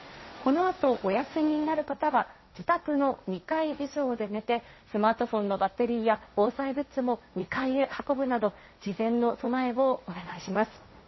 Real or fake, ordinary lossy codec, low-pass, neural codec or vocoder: fake; MP3, 24 kbps; 7.2 kHz; codec, 16 kHz, 1.1 kbps, Voila-Tokenizer